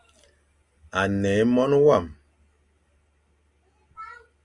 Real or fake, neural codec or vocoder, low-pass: real; none; 10.8 kHz